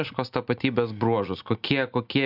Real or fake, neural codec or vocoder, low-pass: real; none; 5.4 kHz